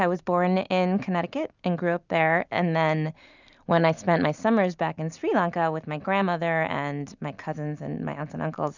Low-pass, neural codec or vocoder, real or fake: 7.2 kHz; none; real